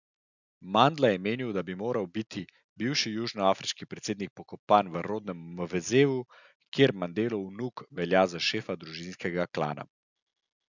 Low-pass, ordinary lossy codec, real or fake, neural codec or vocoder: 7.2 kHz; none; real; none